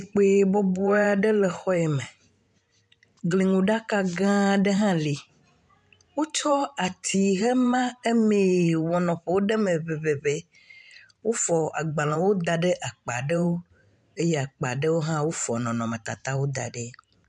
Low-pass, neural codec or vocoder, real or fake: 10.8 kHz; vocoder, 44.1 kHz, 128 mel bands every 512 samples, BigVGAN v2; fake